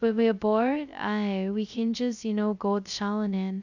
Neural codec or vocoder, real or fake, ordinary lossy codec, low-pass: codec, 16 kHz, 0.2 kbps, FocalCodec; fake; none; 7.2 kHz